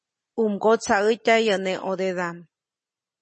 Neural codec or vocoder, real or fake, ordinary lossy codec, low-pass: none; real; MP3, 32 kbps; 9.9 kHz